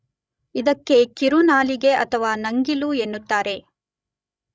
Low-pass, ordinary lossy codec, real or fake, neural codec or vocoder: none; none; fake; codec, 16 kHz, 16 kbps, FreqCodec, larger model